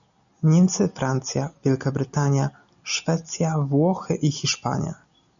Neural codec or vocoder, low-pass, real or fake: none; 7.2 kHz; real